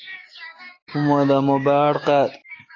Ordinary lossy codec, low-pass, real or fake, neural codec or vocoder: AAC, 32 kbps; 7.2 kHz; fake; codec, 16 kHz, 6 kbps, DAC